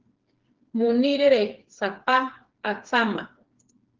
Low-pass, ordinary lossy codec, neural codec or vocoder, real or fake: 7.2 kHz; Opus, 16 kbps; codec, 16 kHz, 4 kbps, FreqCodec, smaller model; fake